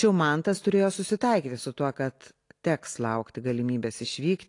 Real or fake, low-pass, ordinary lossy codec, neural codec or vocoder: real; 10.8 kHz; AAC, 48 kbps; none